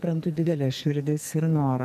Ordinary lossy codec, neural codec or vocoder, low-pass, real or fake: MP3, 96 kbps; codec, 44.1 kHz, 2.6 kbps, SNAC; 14.4 kHz; fake